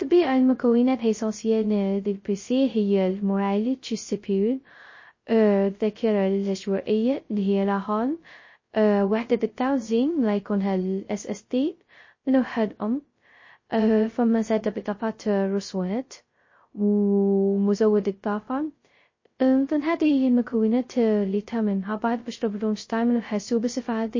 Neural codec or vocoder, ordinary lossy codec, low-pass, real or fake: codec, 16 kHz, 0.2 kbps, FocalCodec; MP3, 32 kbps; 7.2 kHz; fake